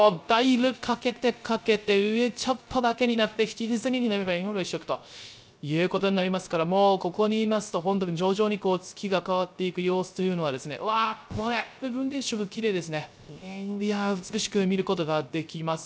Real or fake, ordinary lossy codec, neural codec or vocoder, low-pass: fake; none; codec, 16 kHz, 0.3 kbps, FocalCodec; none